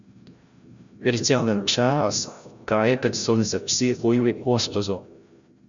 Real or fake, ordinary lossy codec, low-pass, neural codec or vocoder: fake; Opus, 64 kbps; 7.2 kHz; codec, 16 kHz, 0.5 kbps, FreqCodec, larger model